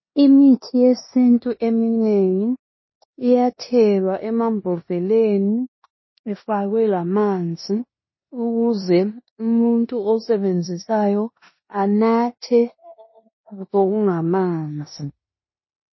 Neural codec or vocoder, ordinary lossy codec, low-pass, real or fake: codec, 16 kHz in and 24 kHz out, 0.9 kbps, LongCat-Audio-Codec, four codebook decoder; MP3, 24 kbps; 7.2 kHz; fake